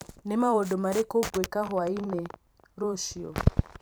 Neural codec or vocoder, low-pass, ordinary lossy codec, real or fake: vocoder, 44.1 kHz, 128 mel bands every 512 samples, BigVGAN v2; none; none; fake